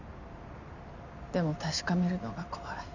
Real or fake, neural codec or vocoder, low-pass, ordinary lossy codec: real; none; 7.2 kHz; none